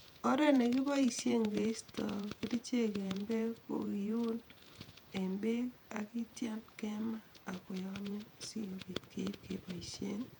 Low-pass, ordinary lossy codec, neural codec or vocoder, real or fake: 19.8 kHz; none; vocoder, 48 kHz, 128 mel bands, Vocos; fake